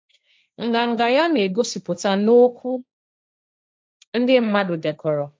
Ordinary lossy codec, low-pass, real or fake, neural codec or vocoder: none; none; fake; codec, 16 kHz, 1.1 kbps, Voila-Tokenizer